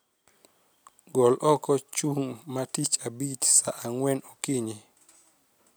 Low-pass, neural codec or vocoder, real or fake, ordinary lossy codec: none; none; real; none